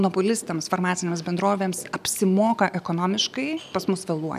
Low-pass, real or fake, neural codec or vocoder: 14.4 kHz; real; none